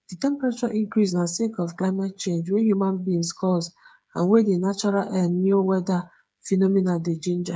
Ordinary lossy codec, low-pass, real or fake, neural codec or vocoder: none; none; fake; codec, 16 kHz, 8 kbps, FreqCodec, smaller model